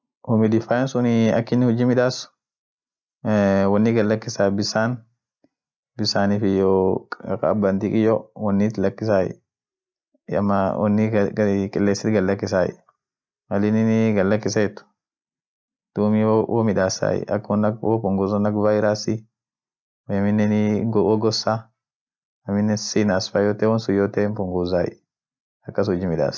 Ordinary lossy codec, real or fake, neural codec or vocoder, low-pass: none; real; none; none